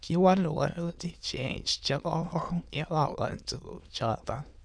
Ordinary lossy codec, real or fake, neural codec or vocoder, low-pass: none; fake; autoencoder, 22.05 kHz, a latent of 192 numbers a frame, VITS, trained on many speakers; 9.9 kHz